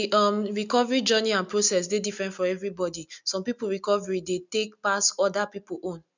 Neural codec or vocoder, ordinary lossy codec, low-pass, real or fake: none; none; 7.2 kHz; real